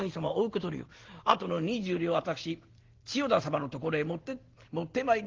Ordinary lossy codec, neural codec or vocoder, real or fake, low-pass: Opus, 16 kbps; none; real; 7.2 kHz